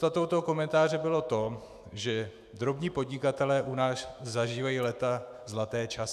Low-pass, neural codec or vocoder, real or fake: 14.4 kHz; autoencoder, 48 kHz, 128 numbers a frame, DAC-VAE, trained on Japanese speech; fake